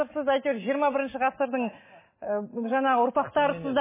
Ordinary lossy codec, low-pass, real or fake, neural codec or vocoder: MP3, 16 kbps; 3.6 kHz; real; none